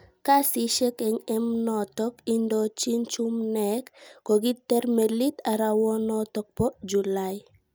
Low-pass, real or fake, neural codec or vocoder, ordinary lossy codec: none; real; none; none